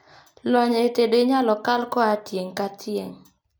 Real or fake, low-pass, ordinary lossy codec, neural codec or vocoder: real; none; none; none